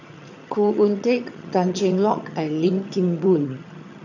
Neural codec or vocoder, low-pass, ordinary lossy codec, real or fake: vocoder, 22.05 kHz, 80 mel bands, HiFi-GAN; 7.2 kHz; none; fake